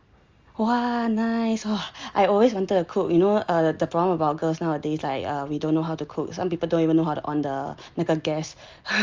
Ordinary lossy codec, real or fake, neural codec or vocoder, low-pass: Opus, 32 kbps; real; none; 7.2 kHz